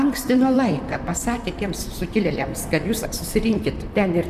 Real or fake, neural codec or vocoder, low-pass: fake; vocoder, 44.1 kHz, 128 mel bands, Pupu-Vocoder; 14.4 kHz